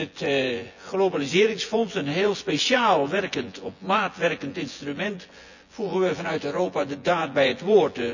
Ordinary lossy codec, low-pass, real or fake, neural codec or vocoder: none; 7.2 kHz; fake; vocoder, 24 kHz, 100 mel bands, Vocos